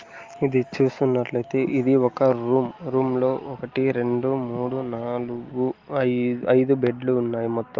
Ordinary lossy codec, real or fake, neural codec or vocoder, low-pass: Opus, 32 kbps; real; none; 7.2 kHz